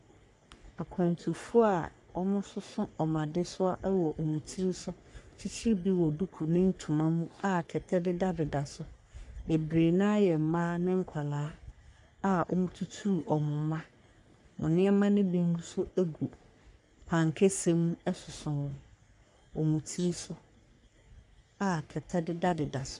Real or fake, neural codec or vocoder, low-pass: fake; codec, 44.1 kHz, 3.4 kbps, Pupu-Codec; 10.8 kHz